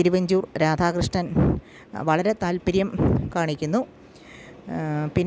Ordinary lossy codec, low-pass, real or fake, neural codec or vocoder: none; none; real; none